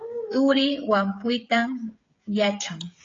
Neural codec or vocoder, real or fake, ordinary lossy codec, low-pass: codec, 16 kHz, 16 kbps, FreqCodec, smaller model; fake; AAC, 32 kbps; 7.2 kHz